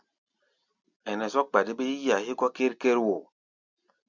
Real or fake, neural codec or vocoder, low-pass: real; none; 7.2 kHz